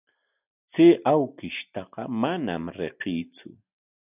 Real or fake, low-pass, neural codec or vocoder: real; 3.6 kHz; none